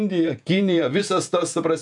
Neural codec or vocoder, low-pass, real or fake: vocoder, 48 kHz, 128 mel bands, Vocos; 10.8 kHz; fake